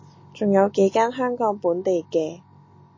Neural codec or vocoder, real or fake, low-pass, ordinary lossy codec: none; real; 7.2 kHz; MP3, 32 kbps